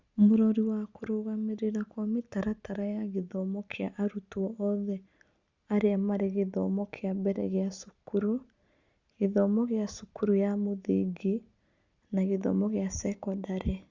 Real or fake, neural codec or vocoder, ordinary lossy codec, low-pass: real; none; Opus, 64 kbps; 7.2 kHz